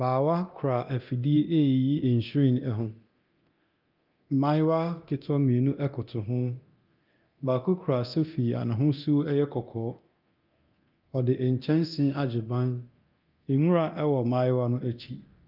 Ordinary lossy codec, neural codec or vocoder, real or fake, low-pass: Opus, 24 kbps; codec, 24 kHz, 0.9 kbps, DualCodec; fake; 5.4 kHz